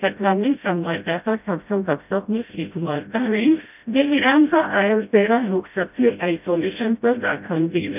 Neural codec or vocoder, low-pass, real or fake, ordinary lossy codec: codec, 16 kHz, 0.5 kbps, FreqCodec, smaller model; 3.6 kHz; fake; none